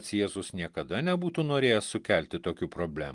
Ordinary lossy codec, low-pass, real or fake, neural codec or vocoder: Opus, 24 kbps; 10.8 kHz; real; none